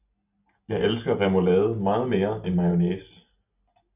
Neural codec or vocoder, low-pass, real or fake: none; 3.6 kHz; real